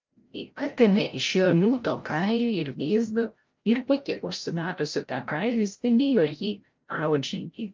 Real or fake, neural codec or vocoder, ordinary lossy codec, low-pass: fake; codec, 16 kHz, 0.5 kbps, FreqCodec, larger model; Opus, 24 kbps; 7.2 kHz